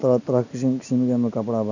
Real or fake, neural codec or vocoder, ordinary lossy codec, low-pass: real; none; none; 7.2 kHz